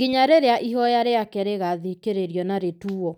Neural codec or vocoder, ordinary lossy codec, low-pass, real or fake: none; none; 19.8 kHz; real